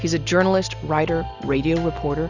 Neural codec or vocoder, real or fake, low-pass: none; real; 7.2 kHz